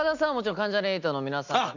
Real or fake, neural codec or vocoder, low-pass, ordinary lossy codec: real; none; 7.2 kHz; none